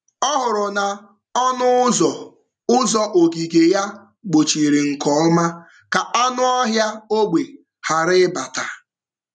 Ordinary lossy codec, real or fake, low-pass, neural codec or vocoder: none; real; 9.9 kHz; none